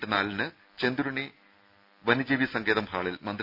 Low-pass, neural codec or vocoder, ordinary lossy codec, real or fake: 5.4 kHz; none; none; real